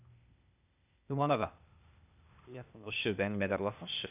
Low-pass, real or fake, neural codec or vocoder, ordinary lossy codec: 3.6 kHz; fake; codec, 16 kHz, 0.8 kbps, ZipCodec; none